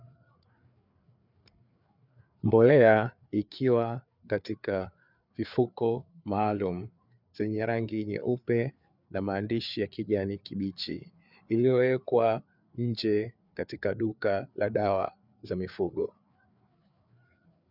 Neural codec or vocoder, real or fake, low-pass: codec, 16 kHz, 4 kbps, FreqCodec, larger model; fake; 5.4 kHz